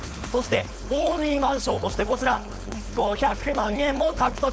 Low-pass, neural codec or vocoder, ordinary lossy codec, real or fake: none; codec, 16 kHz, 4.8 kbps, FACodec; none; fake